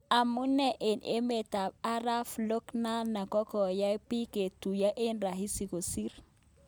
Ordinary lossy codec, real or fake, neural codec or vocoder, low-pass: none; real; none; none